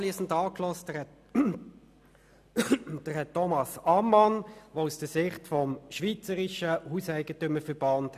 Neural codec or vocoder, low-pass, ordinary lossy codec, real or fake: none; 14.4 kHz; none; real